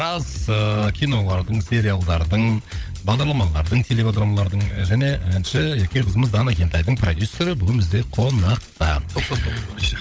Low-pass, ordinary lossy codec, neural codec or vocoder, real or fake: none; none; codec, 16 kHz, 16 kbps, FunCodec, trained on LibriTTS, 50 frames a second; fake